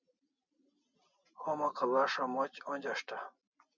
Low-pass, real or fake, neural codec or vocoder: 7.2 kHz; real; none